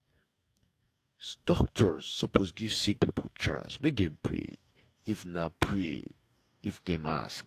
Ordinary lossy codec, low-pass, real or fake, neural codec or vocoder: AAC, 64 kbps; 14.4 kHz; fake; codec, 44.1 kHz, 2.6 kbps, DAC